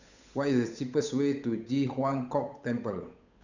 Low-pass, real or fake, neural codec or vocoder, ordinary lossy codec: 7.2 kHz; fake; codec, 16 kHz, 8 kbps, FunCodec, trained on Chinese and English, 25 frames a second; none